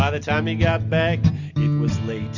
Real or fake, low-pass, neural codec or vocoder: real; 7.2 kHz; none